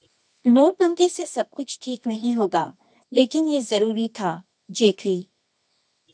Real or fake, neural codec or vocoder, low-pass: fake; codec, 24 kHz, 0.9 kbps, WavTokenizer, medium music audio release; 9.9 kHz